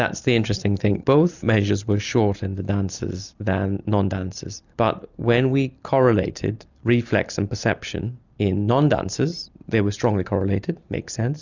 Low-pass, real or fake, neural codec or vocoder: 7.2 kHz; real; none